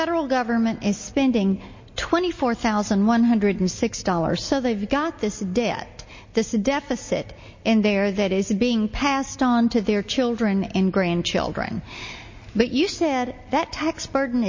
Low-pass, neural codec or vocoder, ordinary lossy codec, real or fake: 7.2 kHz; none; MP3, 32 kbps; real